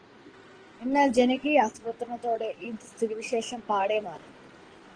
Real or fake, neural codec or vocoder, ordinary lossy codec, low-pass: fake; codec, 16 kHz in and 24 kHz out, 2.2 kbps, FireRedTTS-2 codec; Opus, 16 kbps; 9.9 kHz